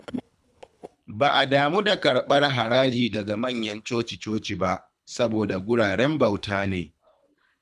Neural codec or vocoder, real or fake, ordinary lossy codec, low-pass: codec, 24 kHz, 3 kbps, HILCodec; fake; none; none